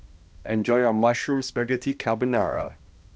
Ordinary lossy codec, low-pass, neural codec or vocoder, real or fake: none; none; codec, 16 kHz, 1 kbps, X-Codec, HuBERT features, trained on balanced general audio; fake